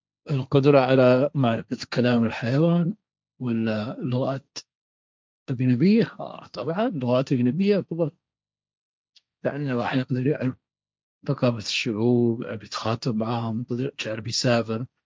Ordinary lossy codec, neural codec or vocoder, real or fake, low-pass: none; codec, 16 kHz, 1.1 kbps, Voila-Tokenizer; fake; none